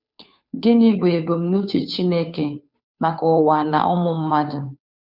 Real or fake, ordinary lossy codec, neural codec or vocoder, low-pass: fake; none; codec, 16 kHz, 2 kbps, FunCodec, trained on Chinese and English, 25 frames a second; 5.4 kHz